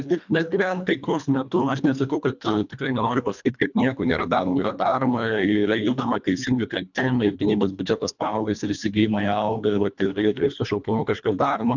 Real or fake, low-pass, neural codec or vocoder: fake; 7.2 kHz; codec, 24 kHz, 1.5 kbps, HILCodec